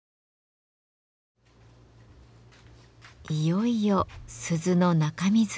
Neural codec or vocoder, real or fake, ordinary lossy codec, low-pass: none; real; none; none